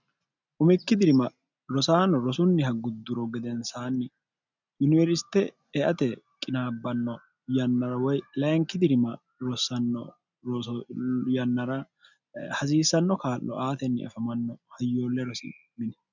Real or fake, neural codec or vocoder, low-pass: real; none; 7.2 kHz